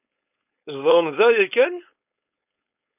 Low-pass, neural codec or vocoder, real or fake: 3.6 kHz; codec, 16 kHz, 4.8 kbps, FACodec; fake